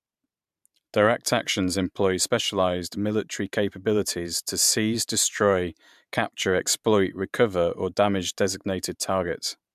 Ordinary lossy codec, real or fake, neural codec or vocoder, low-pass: MP3, 96 kbps; fake; vocoder, 44.1 kHz, 128 mel bands every 512 samples, BigVGAN v2; 14.4 kHz